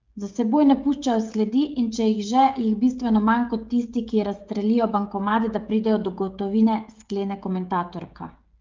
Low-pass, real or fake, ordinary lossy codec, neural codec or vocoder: 7.2 kHz; fake; Opus, 32 kbps; codec, 16 kHz, 16 kbps, FreqCodec, smaller model